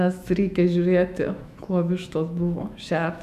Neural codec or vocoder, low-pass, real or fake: autoencoder, 48 kHz, 128 numbers a frame, DAC-VAE, trained on Japanese speech; 14.4 kHz; fake